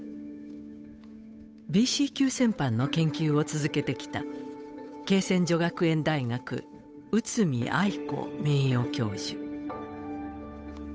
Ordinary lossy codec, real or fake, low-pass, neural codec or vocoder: none; fake; none; codec, 16 kHz, 8 kbps, FunCodec, trained on Chinese and English, 25 frames a second